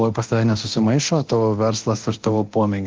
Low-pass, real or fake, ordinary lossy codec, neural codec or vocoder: 7.2 kHz; fake; Opus, 16 kbps; codec, 24 kHz, 0.9 kbps, DualCodec